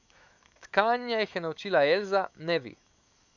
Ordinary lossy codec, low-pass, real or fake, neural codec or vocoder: none; 7.2 kHz; fake; autoencoder, 48 kHz, 128 numbers a frame, DAC-VAE, trained on Japanese speech